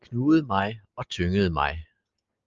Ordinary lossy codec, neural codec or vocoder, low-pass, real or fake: Opus, 24 kbps; none; 7.2 kHz; real